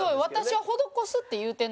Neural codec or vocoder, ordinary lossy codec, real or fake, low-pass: none; none; real; none